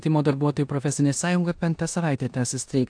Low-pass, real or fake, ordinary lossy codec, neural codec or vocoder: 9.9 kHz; fake; AAC, 64 kbps; codec, 16 kHz in and 24 kHz out, 0.9 kbps, LongCat-Audio-Codec, four codebook decoder